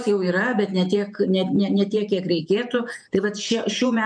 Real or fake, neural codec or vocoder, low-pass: fake; vocoder, 48 kHz, 128 mel bands, Vocos; 9.9 kHz